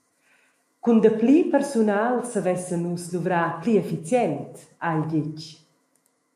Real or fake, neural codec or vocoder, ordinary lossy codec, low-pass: fake; autoencoder, 48 kHz, 128 numbers a frame, DAC-VAE, trained on Japanese speech; MP3, 64 kbps; 14.4 kHz